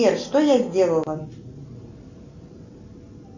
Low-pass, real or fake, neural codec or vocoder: 7.2 kHz; real; none